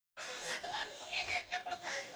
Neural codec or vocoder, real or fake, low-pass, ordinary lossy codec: codec, 44.1 kHz, 2.6 kbps, DAC; fake; none; none